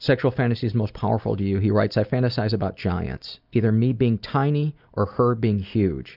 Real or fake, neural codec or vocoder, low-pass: real; none; 5.4 kHz